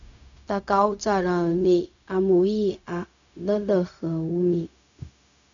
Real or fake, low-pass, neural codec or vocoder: fake; 7.2 kHz; codec, 16 kHz, 0.4 kbps, LongCat-Audio-Codec